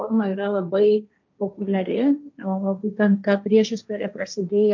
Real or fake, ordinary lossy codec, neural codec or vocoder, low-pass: fake; MP3, 64 kbps; codec, 16 kHz, 1.1 kbps, Voila-Tokenizer; 7.2 kHz